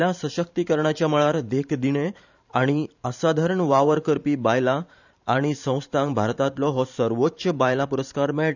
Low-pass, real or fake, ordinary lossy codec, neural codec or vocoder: 7.2 kHz; fake; none; vocoder, 44.1 kHz, 128 mel bands every 512 samples, BigVGAN v2